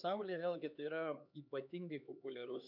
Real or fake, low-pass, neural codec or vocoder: fake; 5.4 kHz; codec, 16 kHz, 4 kbps, X-Codec, HuBERT features, trained on LibriSpeech